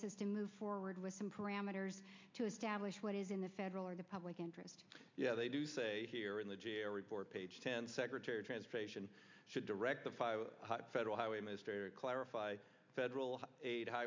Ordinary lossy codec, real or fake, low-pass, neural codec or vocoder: MP3, 64 kbps; real; 7.2 kHz; none